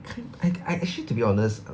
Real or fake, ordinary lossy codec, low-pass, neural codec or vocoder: real; none; none; none